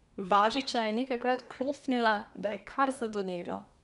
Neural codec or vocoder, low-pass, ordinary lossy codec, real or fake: codec, 24 kHz, 1 kbps, SNAC; 10.8 kHz; none; fake